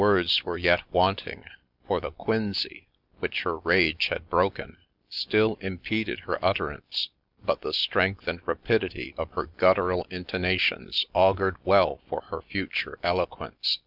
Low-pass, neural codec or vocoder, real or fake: 5.4 kHz; none; real